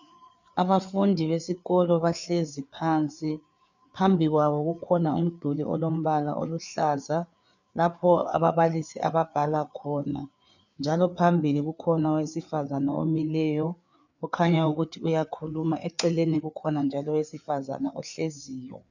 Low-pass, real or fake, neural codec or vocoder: 7.2 kHz; fake; codec, 16 kHz, 4 kbps, FreqCodec, larger model